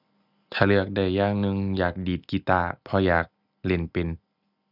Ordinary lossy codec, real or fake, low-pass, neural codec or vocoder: none; real; 5.4 kHz; none